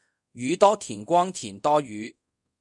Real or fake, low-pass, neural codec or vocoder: fake; 10.8 kHz; codec, 24 kHz, 0.5 kbps, DualCodec